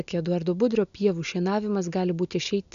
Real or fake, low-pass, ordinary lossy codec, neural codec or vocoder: real; 7.2 kHz; AAC, 96 kbps; none